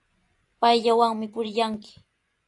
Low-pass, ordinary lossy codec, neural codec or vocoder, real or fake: 10.8 kHz; AAC, 48 kbps; vocoder, 24 kHz, 100 mel bands, Vocos; fake